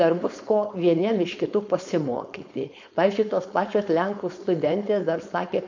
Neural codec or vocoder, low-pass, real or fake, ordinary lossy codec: codec, 16 kHz, 4.8 kbps, FACodec; 7.2 kHz; fake; MP3, 48 kbps